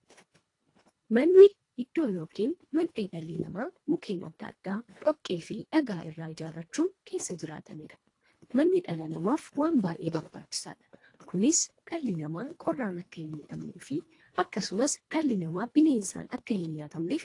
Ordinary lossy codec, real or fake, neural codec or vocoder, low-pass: AAC, 48 kbps; fake; codec, 24 kHz, 1.5 kbps, HILCodec; 10.8 kHz